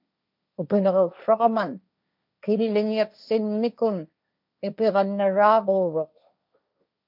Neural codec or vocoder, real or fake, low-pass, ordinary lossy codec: codec, 16 kHz, 1.1 kbps, Voila-Tokenizer; fake; 5.4 kHz; MP3, 48 kbps